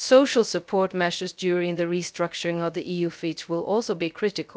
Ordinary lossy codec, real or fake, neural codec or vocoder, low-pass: none; fake; codec, 16 kHz, 0.3 kbps, FocalCodec; none